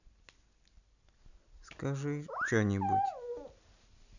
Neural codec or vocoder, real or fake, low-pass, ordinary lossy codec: none; real; 7.2 kHz; none